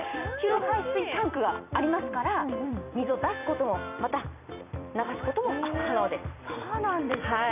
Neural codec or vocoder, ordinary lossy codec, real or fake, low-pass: vocoder, 44.1 kHz, 128 mel bands every 256 samples, BigVGAN v2; none; fake; 3.6 kHz